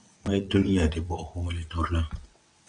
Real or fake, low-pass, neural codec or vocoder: fake; 9.9 kHz; vocoder, 22.05 kHz, 80 mel bands, WaveNeXt